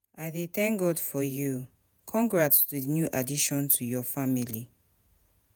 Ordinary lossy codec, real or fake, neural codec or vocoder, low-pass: none; fake; vocoder, 48 kHz, 128 mel bands, Vocos; none